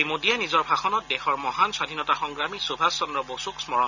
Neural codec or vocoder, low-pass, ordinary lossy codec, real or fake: none; 7.2 kHz; none; real